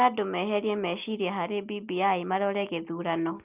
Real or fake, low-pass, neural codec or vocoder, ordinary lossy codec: real; 3.6 kHz; none; Opus, 24 kbps